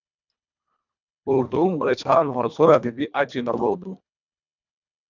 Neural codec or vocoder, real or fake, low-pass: codec, 24 kHz, 1.5 kbps, HILCodec; fake; 7.2 kHz